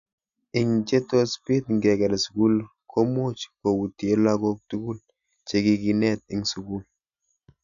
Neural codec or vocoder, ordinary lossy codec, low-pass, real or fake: none; none; 7.2 kHz; real